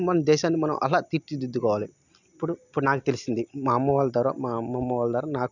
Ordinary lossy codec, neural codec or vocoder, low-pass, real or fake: none; none; 7.2 kHz; real